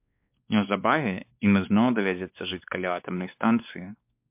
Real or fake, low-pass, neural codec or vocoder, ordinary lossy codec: fake; 3.6 kHz; codec, 16 kHz, 2 kbps, X-Codec, WavLM features, trained on Multilingual LibriSpeech; MP3, 32 kbps